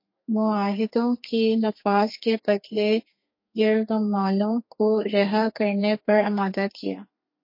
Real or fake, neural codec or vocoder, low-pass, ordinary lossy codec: fake; codec, 32 kHz, 1.9 kbps, SNAC; 5.4 kHz; MP3, 32 kbps